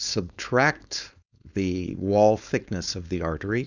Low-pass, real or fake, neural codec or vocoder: 7.2 kHz; fake; codec, 16 kHz, 4.8 kbps, FACodec